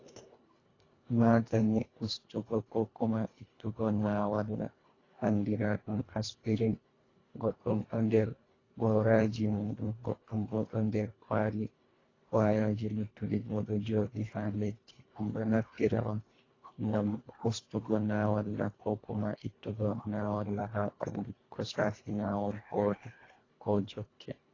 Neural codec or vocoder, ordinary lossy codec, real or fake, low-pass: codec, 24 kHz, 1.5 kbps, HILCodec; AAC, 32 kbps; fake; 7.2 kHz